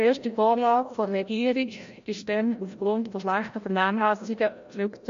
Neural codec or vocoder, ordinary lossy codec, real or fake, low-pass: codec, 16 kHz, 0.5 kbps, FreqCodec, larger model; MP3, 48 kbps; fake; 7.2 kHz